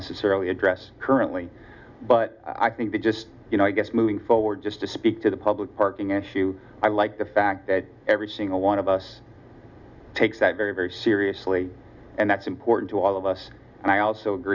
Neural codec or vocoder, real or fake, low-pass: none; real; 7.2 kHz